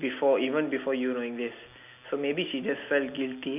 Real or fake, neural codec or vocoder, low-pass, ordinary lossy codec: real; none; 3.6 kHz; none